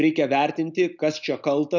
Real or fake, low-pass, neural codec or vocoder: real; 7.2 kHz; none